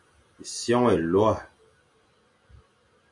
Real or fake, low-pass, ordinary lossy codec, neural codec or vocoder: real; 10.8 kHz; MP3, 64 kbps; none